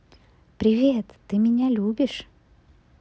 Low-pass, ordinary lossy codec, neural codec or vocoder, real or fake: none; none; none; real